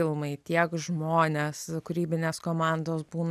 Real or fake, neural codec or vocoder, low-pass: real; none; 14.4 kHz